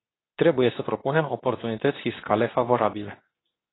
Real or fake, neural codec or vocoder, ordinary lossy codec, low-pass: fake; codec, 24 kHz, 0.9 kbps, WavTokenizer, medium speech release version 2; AAC, 16 kbps; 7.2 kHz